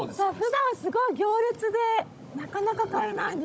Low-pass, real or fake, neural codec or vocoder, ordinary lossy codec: none; fake; codec, 16 kHz, 16 kbps, FunCodec, trained on Chinese and English, 50 frames a second; none